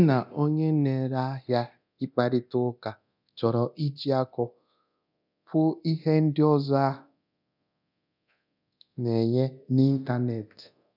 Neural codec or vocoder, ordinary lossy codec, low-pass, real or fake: codec, 24 kHz, 0.9 kbps, DualCodec; none; 5.4 kHz; fake